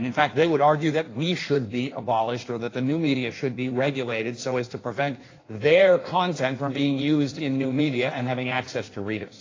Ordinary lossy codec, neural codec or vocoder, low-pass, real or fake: AAC, 32 kbps; codec, 16 kHz in and 24 kHz out, 1.1 kbps, FireRedTTS-2 codec; 7.2 kHz; fake